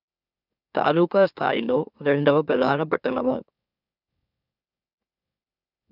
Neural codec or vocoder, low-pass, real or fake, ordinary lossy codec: autoencoder, 44.1 kHz, a latent of 192 numbers a frame, MeloTTS; 5.4 kHz; fake; none